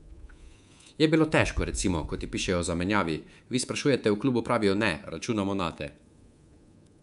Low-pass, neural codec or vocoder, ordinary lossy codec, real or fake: 10.8 kHz; codec, 24 kHz, 3.1 kbps, DualCodec; none; fake